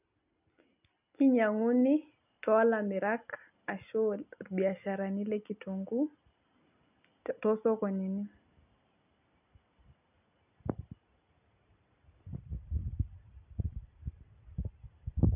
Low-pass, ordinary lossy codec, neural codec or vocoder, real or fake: 3.6 kHz; none; none; real